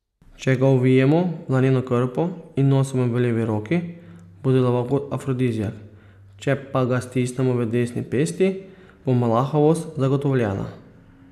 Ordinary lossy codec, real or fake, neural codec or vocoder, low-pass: none; real; none; 14.4 kHz